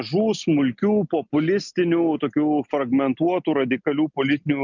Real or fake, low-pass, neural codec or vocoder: real; 7.2 kHz; none